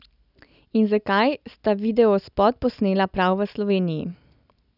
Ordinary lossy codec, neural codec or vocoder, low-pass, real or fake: none; none; 5.4 kHz; real